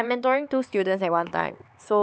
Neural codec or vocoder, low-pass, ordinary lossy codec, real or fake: codec, 16 kHz, 4 kbps, X-Codec, HuBERT features, trained on LibriSpeech; none; none; fake